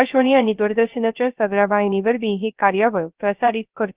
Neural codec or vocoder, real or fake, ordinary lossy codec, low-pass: codec, 16 kHz, 0.3 kbps, FocalCodec; fake; Opus, 24 kbps; 3.6 kHz